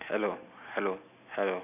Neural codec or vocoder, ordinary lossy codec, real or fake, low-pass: none; none; real; 3.6 kHz